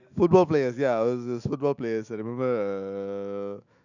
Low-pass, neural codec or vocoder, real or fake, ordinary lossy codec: 7.2 kHz; autoencoder, 48 kHz, 128 numbers a frame, DAC-VAE, trained on Japanese speech; fake; none